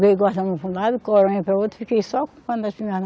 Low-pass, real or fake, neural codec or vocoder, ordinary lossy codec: none; real; none; none